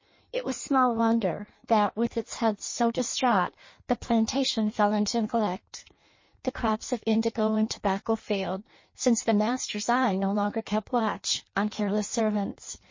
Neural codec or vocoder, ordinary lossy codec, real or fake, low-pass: codec, 16 kHz in and 24 kHz out, 1.1 kbps, FireRedTTS-2 codec; MP3, 32 kbps; fake; 7.2 kHz